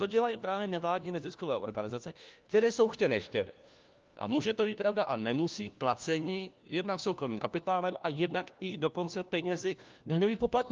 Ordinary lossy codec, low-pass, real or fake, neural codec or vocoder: Opus, 24 kbps; 7.2 kHz; fake; codec, 16 kHz, 1 kbps, FunCodec, trained on LibriTTS, 50 frames a second